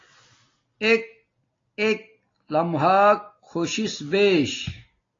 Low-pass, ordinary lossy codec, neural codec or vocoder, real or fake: 7.2 kHz; AAC, 32 kbps; none; real